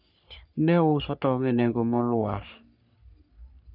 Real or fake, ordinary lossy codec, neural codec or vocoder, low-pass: fake; none; codec, 44.1 kHz, 3.4 kbps, Pupu-Codec; 5.4 kHz